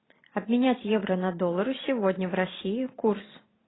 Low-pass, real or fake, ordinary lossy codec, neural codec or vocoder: 7.2 kHz; fake; AAC, 16 kbps; vocoder, 44.1 kHz, 128 mel bands every 512 samples, BigVGAN v2